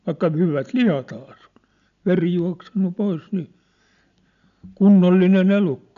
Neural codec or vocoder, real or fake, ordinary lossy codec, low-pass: none; real; none; 7.2 kHz